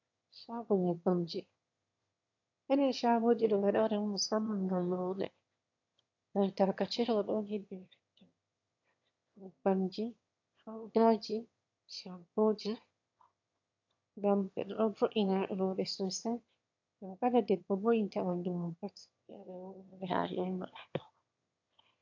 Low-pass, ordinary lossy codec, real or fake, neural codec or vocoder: 7.2 kHz; AAC, 48 kbps; fake; autoencoder, 22.05 kHz, a latent of 192 numbers a frame, VITS, trained on one speaker